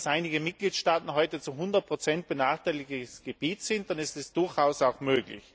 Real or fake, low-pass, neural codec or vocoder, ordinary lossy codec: real; none; none; none